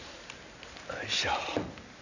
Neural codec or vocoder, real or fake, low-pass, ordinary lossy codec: none; real; 7.2 kHz; none